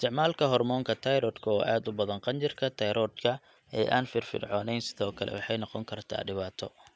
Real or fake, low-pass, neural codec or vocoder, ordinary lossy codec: real; none; none; none